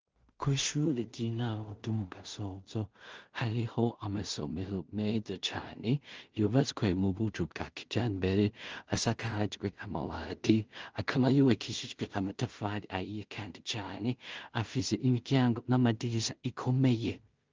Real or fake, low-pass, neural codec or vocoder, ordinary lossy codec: fake; 7.2 kHz; codec, 16 kHz in and 24 kHz out, 0.4 kbps, LongCat-Audio-Codec, two codebook decoder; Opus, 24 kbps